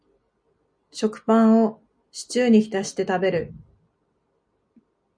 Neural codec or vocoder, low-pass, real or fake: none; 9.9 kHz; real